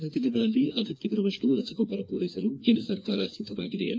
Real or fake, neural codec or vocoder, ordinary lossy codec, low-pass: fake; codec, 16 kHz, 2 kbps, FreqCodec, larger model; none; none